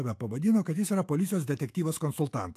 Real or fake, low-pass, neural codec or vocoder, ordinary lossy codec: fake; 14.4 kHz; autoencoder, 48 kHz, 128 numbers a frame, DAC-VAE, trained on Japanese speech; AAC, 64 kbps